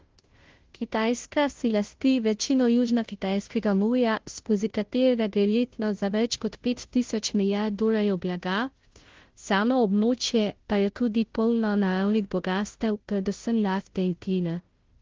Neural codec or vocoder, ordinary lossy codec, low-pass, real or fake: codec, 16 kHz, 0.5 kbps, FunCodec, trained on Chinese and English, 25 frames a second; Opus, 16 kbps; 7.2 kHz; fake